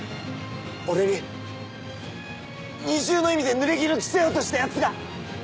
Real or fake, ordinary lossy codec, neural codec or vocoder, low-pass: real; none; none; none